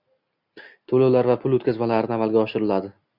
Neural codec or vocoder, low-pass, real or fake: none; 5.4 kHz; real